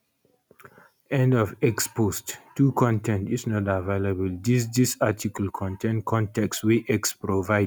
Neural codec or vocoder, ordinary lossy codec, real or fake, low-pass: none; none; real; none